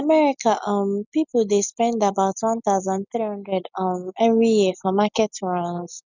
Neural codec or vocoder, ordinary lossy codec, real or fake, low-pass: none; none; real; 7.2 kHz